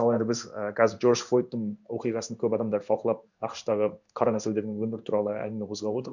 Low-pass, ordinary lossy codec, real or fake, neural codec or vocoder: 7.2 kHz; none; fake; codec, 16 kHz in and 24 kHz out, 1 kbps, XY-Tokenizer